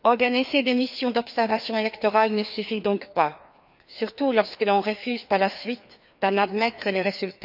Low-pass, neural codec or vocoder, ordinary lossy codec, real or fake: 5.4 kHz; codec, 16 kHz, 2 kbps, FreqCodec, larger model; none; fake